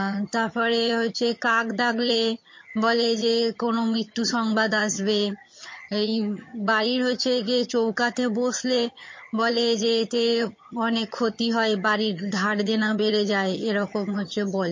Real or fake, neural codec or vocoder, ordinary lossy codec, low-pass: fake; vocoder, 22.05 kHz, 80 mel bands, HiFi-GAN; MP3, 32 kbps; 7.2 kHz